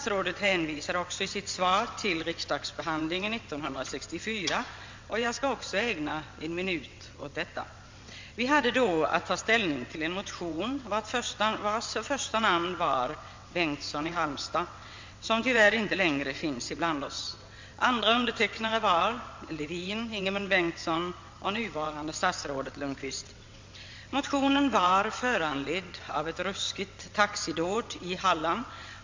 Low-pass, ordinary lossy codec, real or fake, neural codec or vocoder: 7.2 kHz; MP3, 48 kbps; fake; vocoder, 22.05 kHz, 80 mel bands, WaveNeXt